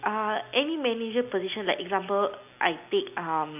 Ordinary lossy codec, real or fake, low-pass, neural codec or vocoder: none; real; 3.6 kHz; none